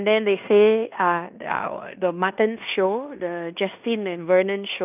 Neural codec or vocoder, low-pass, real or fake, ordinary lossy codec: codec, 16 kHz in and 24 kHz out, 0.9 kbps, LongCat-Audio-Codec, fine tuned four codebook decoder; 3.6 kHz; fake; none